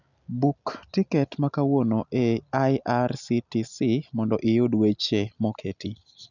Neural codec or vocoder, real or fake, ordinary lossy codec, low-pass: none; real; none; 7.2 kHz